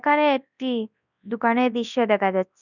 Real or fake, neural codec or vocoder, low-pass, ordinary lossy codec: fake; codec, 24 kHz, 0.9 kbps, WavTokenizer, large speech release; 7.2 kHz; none